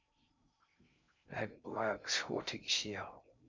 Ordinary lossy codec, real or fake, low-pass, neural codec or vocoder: MP3, 64 kbps; fake; 7.2 kHz; codec, 16 kHz in and 24 kHz out, 0.6 kbps, FocalCodec, streaming, 2048 codes